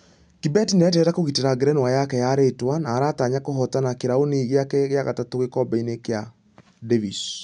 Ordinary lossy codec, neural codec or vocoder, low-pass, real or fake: none; none; 9.9 kHz; real